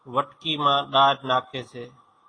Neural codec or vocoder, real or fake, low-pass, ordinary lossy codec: none; real; 9.9 kHz; AAC, 32 kbps